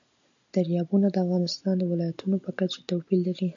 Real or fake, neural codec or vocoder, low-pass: real; none; 7.2 kHz